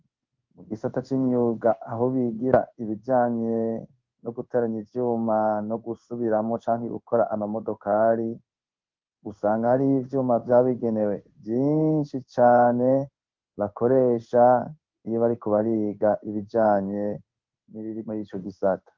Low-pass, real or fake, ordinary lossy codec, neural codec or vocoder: 7.2 kHz; fake; Opus, 32 kbps; codec, 16 kHz in and 24 kHz out, 1 kbps, XY-Tokenizer